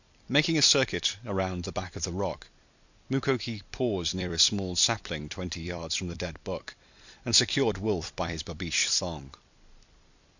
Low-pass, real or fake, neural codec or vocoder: 7.2 kHz; fake; vocoder, 44.1 kHz, 128 mel bands every 256 samples, BigVGAN v2